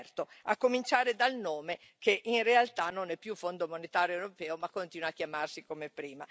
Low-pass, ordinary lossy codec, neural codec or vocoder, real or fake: none; none; none; real